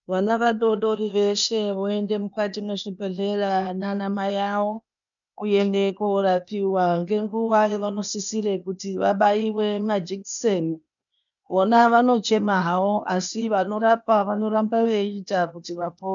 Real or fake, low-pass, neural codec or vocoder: fake; 7.2 kHz; codec, 16 kHz, 0.8 kbps, ZipCodec